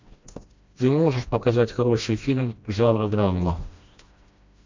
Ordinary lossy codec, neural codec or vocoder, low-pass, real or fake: MP3, 64 kbps; codec, 16 kHz, 1 kbps, FreqCodec, smaller model; 7.2 kHz; fake